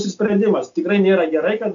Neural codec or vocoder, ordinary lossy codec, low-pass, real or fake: none; MP3, 64 kbps; 7.2 kHz; real